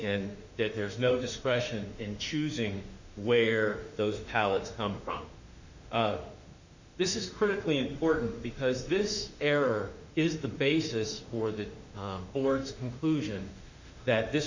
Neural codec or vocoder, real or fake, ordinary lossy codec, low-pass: autoencoder, 48 kHz, 32 numbers a frame, DAC-VAE, trained on Japanese speech; fake; Opus, 64 kbps; 7.2 kHz